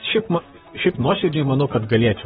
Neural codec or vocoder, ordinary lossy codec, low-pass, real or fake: vocoder, 44.1 kHz, 128 mel bands, Pupu-Vocoder; AAC, 16 kbps; 19.8 kHz; fake